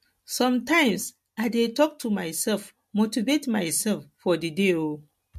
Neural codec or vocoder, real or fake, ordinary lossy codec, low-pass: none; real; MP3, 64 kbps; 14.4 kHz